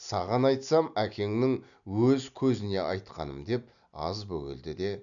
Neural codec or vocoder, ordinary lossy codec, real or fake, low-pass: none; none; real; 7.2 kHz